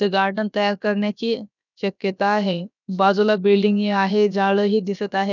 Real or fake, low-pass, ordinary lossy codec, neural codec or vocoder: fake; 7.2 kHz; none; codec, 16 kHz, about 1 kbps, DyCAST, with the encoder's durations